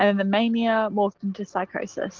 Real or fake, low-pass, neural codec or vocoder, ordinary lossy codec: real; 7.2 kHz; none; Opus, 16 kbps